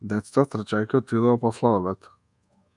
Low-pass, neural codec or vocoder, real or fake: 10.8 kHz; codec, 24 kHz, 1.2 kbps, DualCodec; fake